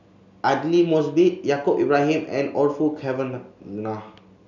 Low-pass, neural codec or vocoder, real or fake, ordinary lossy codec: 7.2 kHz; none; real; none